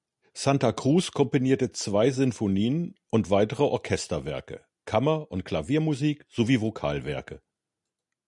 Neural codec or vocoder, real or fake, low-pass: none; real; 10.8 kHz